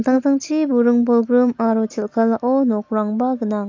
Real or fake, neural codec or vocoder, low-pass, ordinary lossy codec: real; none; 7.2 kHz; AAC, 48 kbps